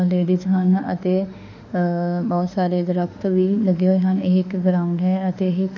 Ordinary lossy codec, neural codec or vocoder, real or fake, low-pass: none; autoencoder, 48 kHz, 32 numbers a frame, DAC-VAE, trained on Japanese speech; fake; 7.2 kHz